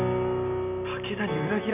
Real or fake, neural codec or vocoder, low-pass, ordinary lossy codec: real; none; 3.6 kHz; none